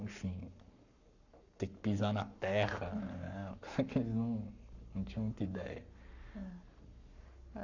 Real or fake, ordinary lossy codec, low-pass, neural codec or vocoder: fake; none; 7.2 kHz; codec, 44.1 kHz, 7.8 kbps, Pupu-Codec